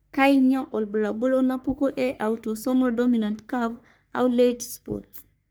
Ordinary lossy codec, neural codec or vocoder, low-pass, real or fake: none; codec, 44.1 kHz, 3.4 kbps, Pupu-Codec; none; fake